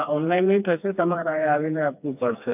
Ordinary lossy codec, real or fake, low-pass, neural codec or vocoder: AAC, 32 kbps; fake; 3.6 kHz; codec, 16 kHz, 2 kbps, FreqCodec, smaller model